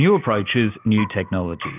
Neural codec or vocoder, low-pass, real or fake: none; 3.6 kHz; real